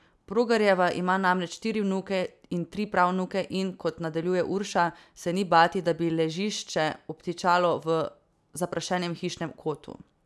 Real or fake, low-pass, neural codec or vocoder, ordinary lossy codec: real; none; none; none